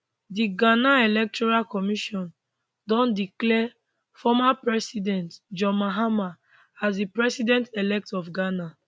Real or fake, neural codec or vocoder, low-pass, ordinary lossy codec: real; none; none; none